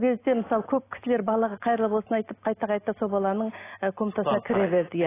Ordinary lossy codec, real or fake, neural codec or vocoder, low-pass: AAC, 16 kbps; real; none; 3.6 kHz